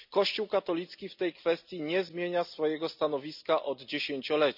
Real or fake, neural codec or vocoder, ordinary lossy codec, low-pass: real; none; none; 5.4 kHz